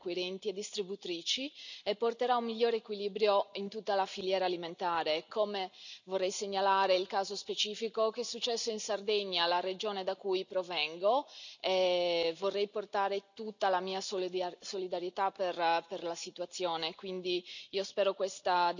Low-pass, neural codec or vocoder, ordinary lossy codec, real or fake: 7.2 kHz; none; none; real